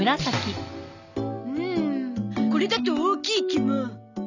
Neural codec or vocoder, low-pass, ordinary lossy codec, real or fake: none; 7.2 kHz; none; real